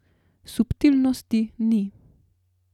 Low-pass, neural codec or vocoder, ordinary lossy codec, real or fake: 19.8 kHz; none; none; real